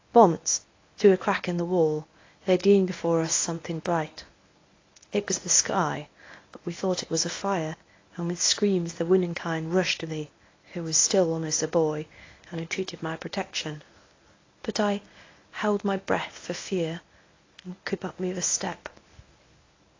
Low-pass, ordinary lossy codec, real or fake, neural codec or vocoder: 7.2 kHz; AAC, 32 kbps; fake; codec, 24 kHz, 0.9 kbps, WavTokenizer, medium speech release version 1